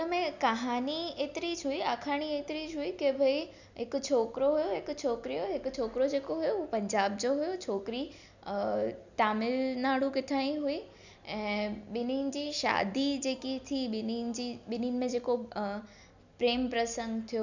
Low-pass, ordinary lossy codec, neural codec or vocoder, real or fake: 7.2 kHz; none; none; real